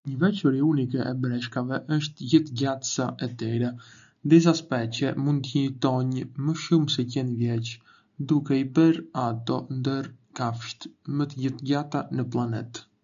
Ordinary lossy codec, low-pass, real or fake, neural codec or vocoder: none; 7.2 kHz; real; none